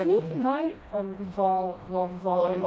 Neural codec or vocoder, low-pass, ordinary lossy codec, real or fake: codec, 16 kHz, 1 kbps, FreqCodec, smaller model; none; none; fake